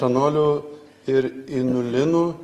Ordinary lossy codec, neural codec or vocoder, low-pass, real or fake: Opus, 24 kbps; none; 14.4 kHz; real